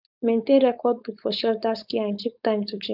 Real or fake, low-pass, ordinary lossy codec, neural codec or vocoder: fake; 5.4 kHz; none; codec, 16 kHz, 4.8 kbps, FACodec